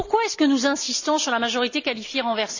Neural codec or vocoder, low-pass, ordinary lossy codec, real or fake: none; 7.2 kHz; none; real